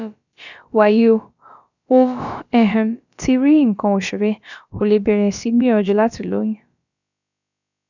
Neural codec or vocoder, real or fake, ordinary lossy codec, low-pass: codec, 16 kHz, about 1 kbps, DyCAST, with the encoder's durations; fake; none; 7.2 kHz